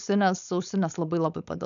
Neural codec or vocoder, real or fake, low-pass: codec, 16 kHz, 4.8 kbps, FACodec; fake; 7.2 kHz